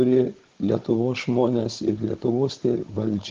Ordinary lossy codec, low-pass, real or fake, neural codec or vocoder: Opus, 16 kbps; 7.2 kHz; fake; codec, 16 kHz, 16 kbps, FunCodec, trained on Chinese and English, 50 frames a second